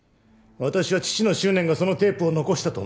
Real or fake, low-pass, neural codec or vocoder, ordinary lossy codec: real; none; none; none